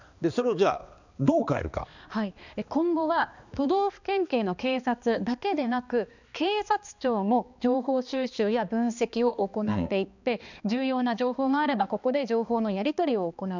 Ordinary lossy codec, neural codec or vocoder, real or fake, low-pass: none; codec, 16 kHz, 2 kbps, X-Codec, HuBERT features, trained on balanced general audio; fake; 7.2 kHz